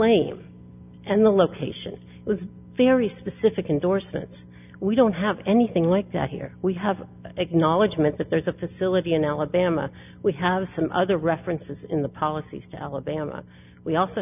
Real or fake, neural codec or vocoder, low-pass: real; none; 3.6 kHz